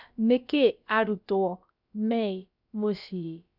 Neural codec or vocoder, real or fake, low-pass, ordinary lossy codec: codec, 16 kHz, about 1 kbps, DyCAST, with the encoder's durations; fake; 5.4 kHz; none